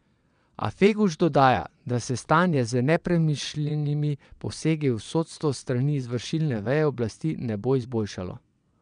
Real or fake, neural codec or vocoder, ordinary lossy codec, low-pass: fake; vocoder, 22.05 kHz, 80 mel bands, WaveNeXt; none; 9.9 kHz